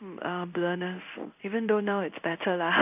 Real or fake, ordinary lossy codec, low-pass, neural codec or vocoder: fake; none; 3.6 kHz; codec, 16 kHz in and 24 kHz out, 1 kbps, XY-Tokenizer